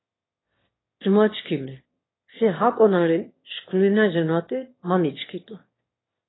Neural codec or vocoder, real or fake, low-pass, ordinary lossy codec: autoencoder, 22.05 kHz, a latent of 192 numbers a frame, VITS, trained on one speaker; fake; 7.2 kHz; AAC, 16 kbps